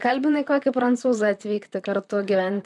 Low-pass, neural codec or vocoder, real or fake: 10.8 kHz; vocoder, 44.1 kHz, 128 mel bands, Pupu-Vocoder; fake